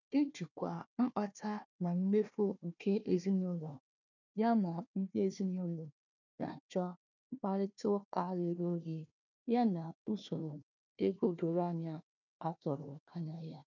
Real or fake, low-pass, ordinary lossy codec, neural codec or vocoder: fake; 7.2 kHz; none; codec, 16 kHz, 1 kbps, FunCodec, trained on Chinese and English, 50 frames a second